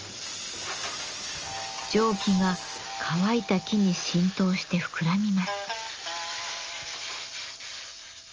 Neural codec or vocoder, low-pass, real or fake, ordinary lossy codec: none; 7.2 kHz; real; Opus, 24 kbps